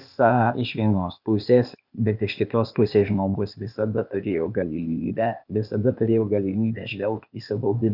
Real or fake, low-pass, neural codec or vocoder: fake; 5.4 kHz; codec, 16 kHz, 0.8 kbps, ZipCodec